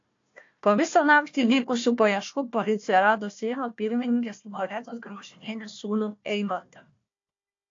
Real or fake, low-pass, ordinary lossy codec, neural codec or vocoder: fake; 7.2 kHz; AAC, 48 kbps; codec, 16 kHz, 1 kbps, FunCodec, trained on Chinese and English, 50 frames a second